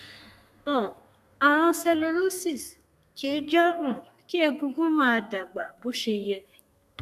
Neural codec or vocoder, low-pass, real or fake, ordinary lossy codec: codec, 32 kHz, 1.9 kbps, SNAC; 14.4 kHz; fake; none